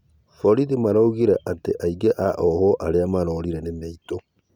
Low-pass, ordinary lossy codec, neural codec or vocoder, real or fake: 19.8 kHz; none; none; real